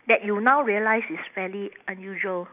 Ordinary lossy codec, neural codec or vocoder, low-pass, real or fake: none; none; 3.6 kHz; real